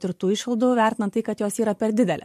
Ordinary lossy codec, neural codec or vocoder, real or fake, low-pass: MP3, 64 kbps; vocoder, 44.1 kHz, 128 mel bands every 512 samples, BigVGAN v2; fake; 14.4 kHz